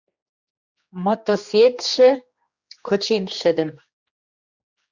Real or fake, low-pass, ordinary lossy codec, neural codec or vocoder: fake; 7.2 kHz; Opus, 64 kbps; codec, 16 kHz, 2 kbps, X-Codec, HuBERT features, trained on general audio